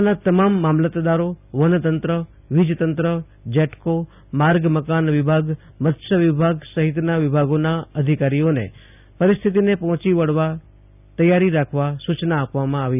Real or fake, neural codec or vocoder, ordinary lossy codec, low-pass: real; none; none; 3.6 kHz